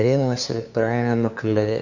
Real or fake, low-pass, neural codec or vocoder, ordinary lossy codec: fake; 7.2 kHz; codec, 16 kHz, 2 kbps, FunCodec, trained on LibriTTS, 25 frames a second; none